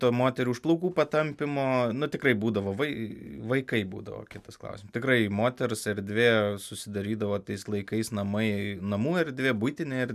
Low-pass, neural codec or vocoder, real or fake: 14.4 kHz; none; real